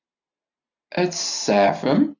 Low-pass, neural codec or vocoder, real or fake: 7.2 kHz; none; real